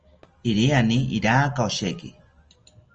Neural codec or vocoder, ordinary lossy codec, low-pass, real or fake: none; Opus, 24 kbps; 7.2 kHz; real